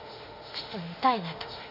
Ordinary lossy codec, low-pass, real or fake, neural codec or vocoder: none; 5.4 kHz; fake; autoencoder, 48 kHz, 32 numbers a frame, DAC-VAE, trained on Japanese speech